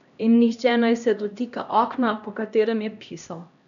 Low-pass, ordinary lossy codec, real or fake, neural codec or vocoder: 7.2 kHz; none; fake; codec, 16 kHz, 1 kbps, X-Codec, HuBERT features, trained on LibriSpeech